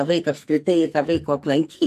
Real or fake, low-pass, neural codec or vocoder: fake; 14.4 kHz; codec, 44.1 kHz, 2.6 kbps, SNAC